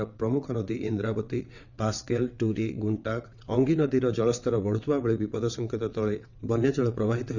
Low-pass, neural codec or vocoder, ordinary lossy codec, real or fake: 7.2 kHz; vocoder, 22.05 kHz, 80 mel bands, WaveNeXt; none; fake